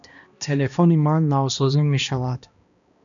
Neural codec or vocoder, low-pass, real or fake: codec, 16 kHz, 1 kbps, X-Codec, HuBERT features, trained on balanced general audio; 7.2 kHz; fake